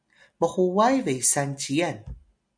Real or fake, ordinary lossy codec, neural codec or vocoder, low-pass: real; MP3, 96 kbps; none; 9.9 kHz